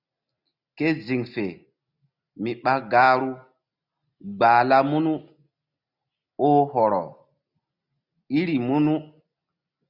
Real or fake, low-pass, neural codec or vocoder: real; 5.4 kHz; none